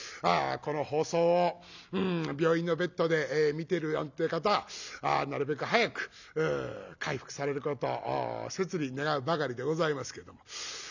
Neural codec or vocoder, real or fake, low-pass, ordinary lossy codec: none; real; 7.2 kHz; none